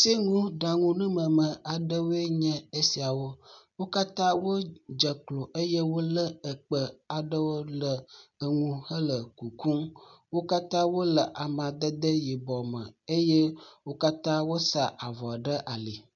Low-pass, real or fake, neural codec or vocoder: 7.2 kHz; real; none